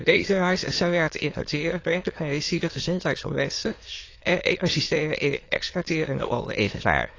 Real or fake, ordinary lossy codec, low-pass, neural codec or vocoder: fake; AAC, 32 kbps; 7.2 kHz; autoencoder, 22.05 kHz, a latent of 192 numbers a frame, VITS, trained on many speakers